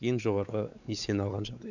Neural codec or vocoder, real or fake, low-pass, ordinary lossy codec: codec, 16 kHz, 4 kbps, X-Codec, WavLM features, trained on Multilingual LibriSpeech; fake; 7.2 kHz; none